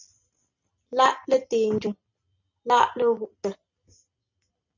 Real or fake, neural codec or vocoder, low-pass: real; none; 7.2 kHz